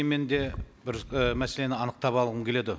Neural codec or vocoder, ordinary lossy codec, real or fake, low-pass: none; none; real; none